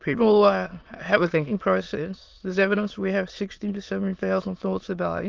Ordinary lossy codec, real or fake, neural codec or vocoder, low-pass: Opus, 24 kbps; fake; autoencoder, 22.05 kHz, a latent of 192 numbers a frame, VITS, trained on many speakers; 7.2 kHz